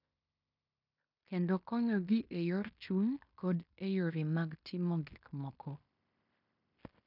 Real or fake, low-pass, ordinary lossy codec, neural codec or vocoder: fake; 5.4 kHz; none; codec, 16 kHz in and 24 kHz out, 0.9 kbps, LongCat-Audio-Codec, fine tuned four codebook decoder